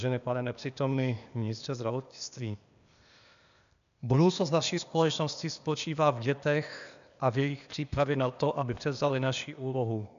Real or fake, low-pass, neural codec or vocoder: fake; 7.2 kHz; codec, 16 kHz, 0.8 kbps, ZipCodec